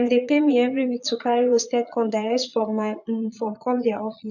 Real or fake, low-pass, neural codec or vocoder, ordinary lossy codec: fake; 7.2 kHz; vocoder, 22.05 kHz, 80 mel bands, Vocos; none